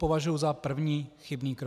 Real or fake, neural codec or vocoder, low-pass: real; none; 14.4 kHz